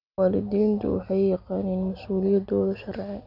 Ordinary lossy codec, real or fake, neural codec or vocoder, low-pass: none; real; none; 5.4 kHz